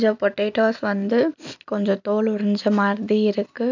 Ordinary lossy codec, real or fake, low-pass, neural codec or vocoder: none; real; 7.2 kHz; none